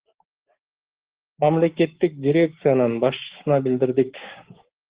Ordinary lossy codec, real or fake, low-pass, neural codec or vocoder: Opus, 16 kbps; fake; 3.6 kHz; codec, 16 kHz, 6 kbps, DAC